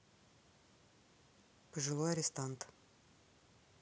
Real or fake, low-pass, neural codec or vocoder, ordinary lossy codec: real; none; none; none